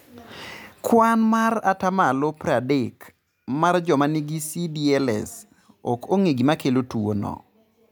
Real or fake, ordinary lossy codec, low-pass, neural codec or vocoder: real; none; none; none